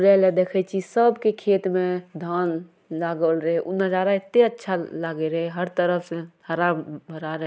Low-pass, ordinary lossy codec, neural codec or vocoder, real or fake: none; none; none; real